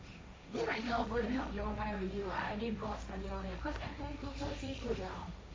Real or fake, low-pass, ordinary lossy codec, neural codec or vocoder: fake; none; none; codec, 16 kHz, 1.1 kbps, Voila-Tokenizer